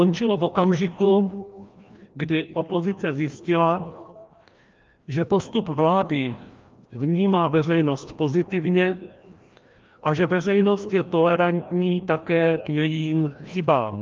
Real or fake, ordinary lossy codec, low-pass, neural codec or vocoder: fake; Opus, 24 kbps; 7.2 kHz; codec, 16 kHz, 1 kbps, FreqCodec, larger model